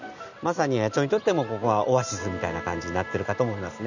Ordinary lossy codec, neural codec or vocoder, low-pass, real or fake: none; none; 7.2 kHz; real